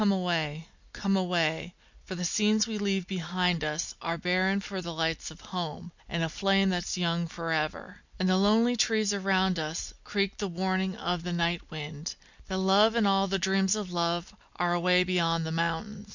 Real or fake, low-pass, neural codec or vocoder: real; 7.2 kHz; none